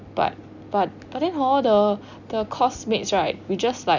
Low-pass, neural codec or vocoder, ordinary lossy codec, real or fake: 7.2 kHz; none; none; real